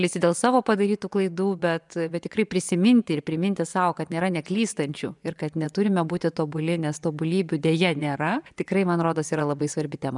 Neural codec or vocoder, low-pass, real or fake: vocoder, 24 kHz, 100 mel bands, Vocos; 10.8 kHz; fake